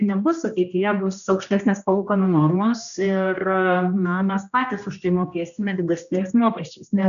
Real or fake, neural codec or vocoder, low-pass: fake; codec, 16 kHz, 1 kbps, X-Codec, HuBERT features, trained on general audio; 7.2 kHz